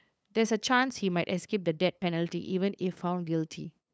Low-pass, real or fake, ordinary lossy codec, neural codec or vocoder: none; fake; none; codec, 16 kHz, 8 kbps, FunCodec, trained on LibriTTS, 25 frames a second